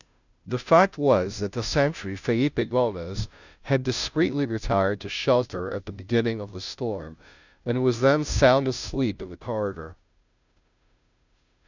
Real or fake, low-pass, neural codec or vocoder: fake; 7.2 kHz; codec, 16 kHz, 0.5 kbps, FunCodec, trained on Chinese and English, 25 frames a second